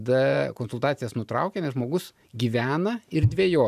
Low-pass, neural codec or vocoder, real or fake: 14.4 kHz; vocoder, 44.1 kHz, 128 mel bands every 512 samples, BigVGAN v2; fake